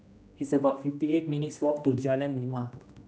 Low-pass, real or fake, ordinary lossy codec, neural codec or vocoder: none; fake; none; codec, 16 kHz, 1 kbps, X-Codec, HuBERT features, trained on general audio